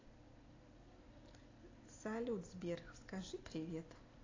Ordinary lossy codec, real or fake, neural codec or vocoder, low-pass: AAC, 32 kbps; real; none; 7.2 kHz